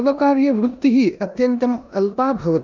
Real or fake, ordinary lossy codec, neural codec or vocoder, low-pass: fake; none; codec, 16 kHz in and 24 kHz out, 0.9 kbps, LongCat-Audio-Codec, four codebook decoder; 7.2 kHz